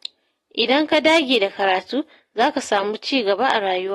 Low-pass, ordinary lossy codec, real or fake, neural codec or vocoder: 19.8 kHz; AAC, 32 kbps; real; none